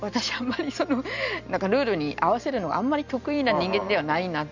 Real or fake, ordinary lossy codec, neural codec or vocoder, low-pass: real; none; none; 7.2 kHz